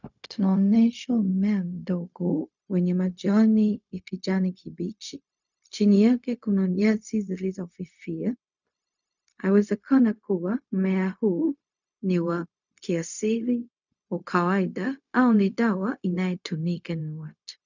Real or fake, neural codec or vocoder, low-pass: fake; codec, 16 kHz, 0.4 kbps, LongCat-Audio-Codec; 7.2 kHz